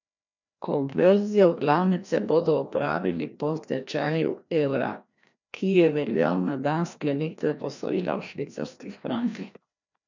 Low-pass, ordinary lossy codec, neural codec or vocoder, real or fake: 7.2 kHz; none; codec, 16 kHz, 1 kbps, FreqCodec, larger model; fake